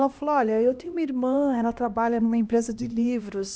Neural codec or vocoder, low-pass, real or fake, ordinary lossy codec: codec, 16 kHz, 1 kbps, X-Codec, HuBERT features, trained on LibriSpeech; none; fake; none